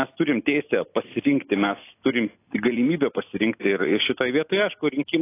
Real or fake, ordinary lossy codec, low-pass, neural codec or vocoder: real; AAC, 24 kbps; 3.6 kHz; none